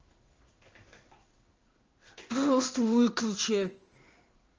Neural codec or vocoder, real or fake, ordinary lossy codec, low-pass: codec, 16 kHz in and 24 kHz out, 1 kbps, XY-Tokenizer; fake; Opus, 24 kbps; 7.2 kHz